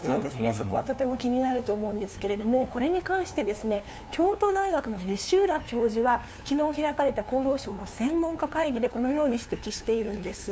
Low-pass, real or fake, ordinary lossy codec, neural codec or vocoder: none; fake; none; codec, 16 kHz, 2 kbps, FunCodec, trained on LibriTTS, 25 frames a second